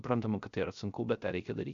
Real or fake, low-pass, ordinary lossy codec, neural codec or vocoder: fake; 7.2 kHz; MP3, 64 kbps; codec, 16 kHz, 0.3 kbps, FocalCodec